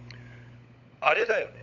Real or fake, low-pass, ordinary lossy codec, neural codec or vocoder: fake; 7.2 kHz; none; codec, 16 kHz, 16 kbps, FunCodec, trained on LibriTTS, 50 frames a second